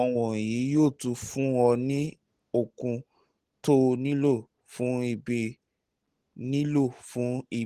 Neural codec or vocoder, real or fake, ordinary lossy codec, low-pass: none; real; Opus, 16 kbps; 14.4 kHz